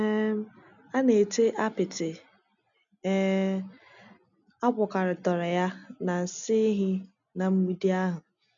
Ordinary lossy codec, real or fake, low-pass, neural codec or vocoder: none; real; 7.2 kHz; none